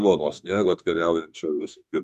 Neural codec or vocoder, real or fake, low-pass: autoencoder, 48 kHz, 32 numbers a frame, DAC-VAE, trained on Japanese speech; fake; 14.4 kHz